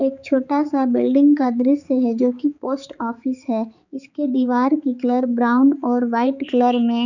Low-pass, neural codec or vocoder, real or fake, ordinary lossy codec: 7.2 kHz; codec, 16 kHz, 4 kbps, X-Codec, HuBERT features, trained on balanced general audio; fake; none